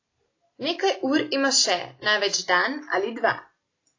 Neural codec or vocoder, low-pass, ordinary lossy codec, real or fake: none; 7.2 kHz; AAC, 32 kbps; real